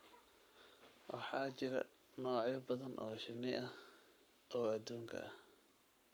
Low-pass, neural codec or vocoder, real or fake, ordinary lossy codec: none; codec, 44.1 kHz, 7.8 kbps, Pupu-Codec; fake; none